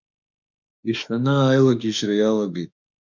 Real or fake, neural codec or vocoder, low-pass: fake; autoencoder, 48 kHz, 32 numbers a frame, DAC-VAE, trained on Japanese speech; 7.2 kHz